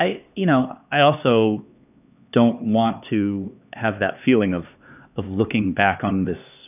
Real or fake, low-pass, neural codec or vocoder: fake; 3.6 kHz; codec, 16 kHz, 2 kbps, X-Codec, HuBERT features, trained on LibriSpeech